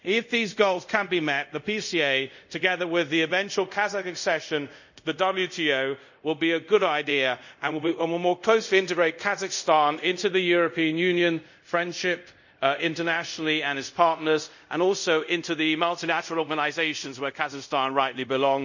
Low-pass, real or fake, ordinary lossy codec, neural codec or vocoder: 7.2 kHz; fake; none; codec, 24 kHz, 0.5 kbps, DualCodec